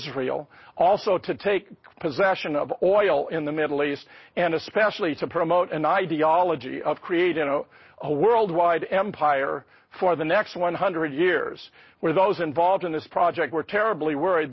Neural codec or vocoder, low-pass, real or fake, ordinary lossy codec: none; 7.2 kHz; real; MP3, 24 kbps